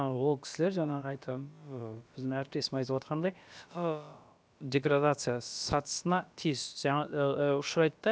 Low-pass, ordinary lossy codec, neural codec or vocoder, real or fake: none; none; codec, 16 kHz, about 1 kbps, DyCAST, with the encoder's durations; fake